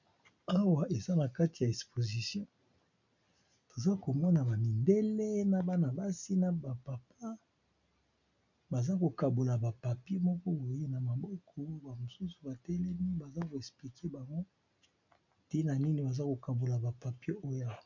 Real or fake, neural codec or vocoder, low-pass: real; none; 7.2 kHz